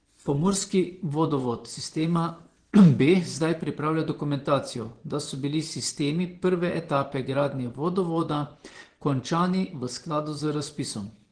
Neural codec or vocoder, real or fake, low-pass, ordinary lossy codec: vocoder, 24 kHz, 100 mel bands, Vocos; fake; 9.9 kHz; Opus, 16 kbps